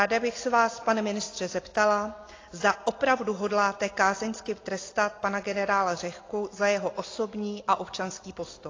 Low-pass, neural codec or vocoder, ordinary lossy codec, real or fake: 7.2 kHz; none; AAC, 32 kbps; real